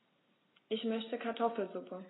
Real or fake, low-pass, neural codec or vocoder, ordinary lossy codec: real; 7.2 kHz; none; AAC, 16 kbps